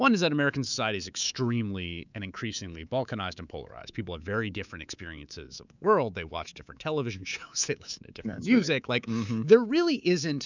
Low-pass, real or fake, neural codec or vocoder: 7.2 kHz; fake; codec, 24 kHz, 3.1 kbps, DualCodec